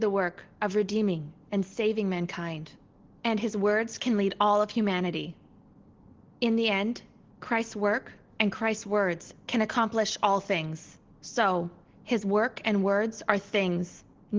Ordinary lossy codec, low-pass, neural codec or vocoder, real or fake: Opus, 16 kbps; 7.2 kHz; none; real